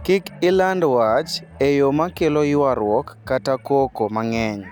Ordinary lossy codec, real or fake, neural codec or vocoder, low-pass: none; real; none; 19.8 kHz